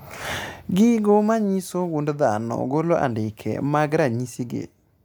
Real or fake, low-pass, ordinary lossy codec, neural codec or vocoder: real; none; none; none